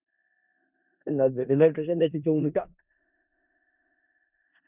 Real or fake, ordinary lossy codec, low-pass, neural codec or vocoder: fake; none; 3.6 kHz; codec, 16 kHz in and 24 kHz out, 0.4 kbps, LongCat-Audio-Codec, four codebook decoder